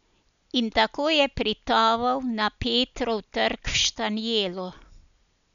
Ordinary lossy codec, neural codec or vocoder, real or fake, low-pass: none; none; real; 7.2 kHz